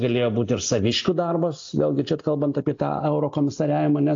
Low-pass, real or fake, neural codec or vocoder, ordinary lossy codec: 7.2 kHz; real; none; AAC, 48 kbps